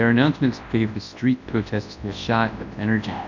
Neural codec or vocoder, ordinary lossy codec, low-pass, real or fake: codec, 24 kHz, 0.9 kbps, WavTokenizer, large speech release; MP3, 64 kbps; 7.2 kHz; fake